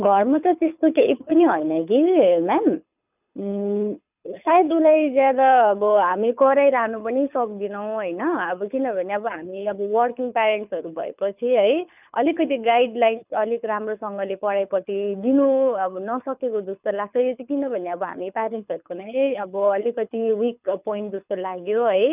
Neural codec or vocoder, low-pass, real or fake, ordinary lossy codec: codec, 24 kHz, 6 kbps, HILCodec; 3.6 kHz; fake; none